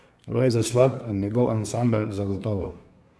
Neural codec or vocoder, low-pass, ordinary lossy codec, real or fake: codec, 24 kHz, 1 kbps, SNAC; none; none; fake